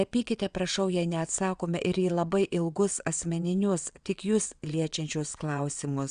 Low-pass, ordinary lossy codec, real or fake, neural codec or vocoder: 9.9 kHz; Opus, 64 kbps; fake; vocoder, 22.05 kHz, 80 mel bands, WaveNeXt